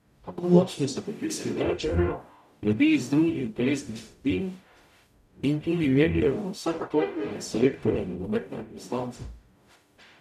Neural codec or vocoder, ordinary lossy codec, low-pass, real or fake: codec, 44.1 kHz, 0.9 kbps, DAC; none; 14.4 kHz; fake